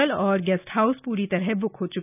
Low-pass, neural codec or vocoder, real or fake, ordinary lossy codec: 3.6 kHz; none; real; none